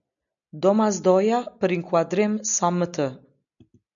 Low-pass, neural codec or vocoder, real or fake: 7.2 kHz; none; real